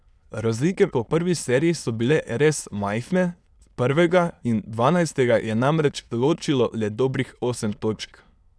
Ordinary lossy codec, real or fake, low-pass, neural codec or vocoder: none; fake; none; autoencoder, 22.05 kHz, a latent of 192 numbers a frame, VITS, trained on many speakers